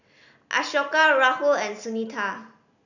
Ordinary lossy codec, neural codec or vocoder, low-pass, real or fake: none; none; 7.2 kHz; real